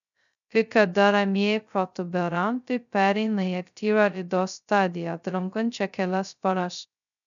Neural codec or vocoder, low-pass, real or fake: codec, 16 kHz, 0.2 kbps, FocalCodec; 7.2 kHz; fake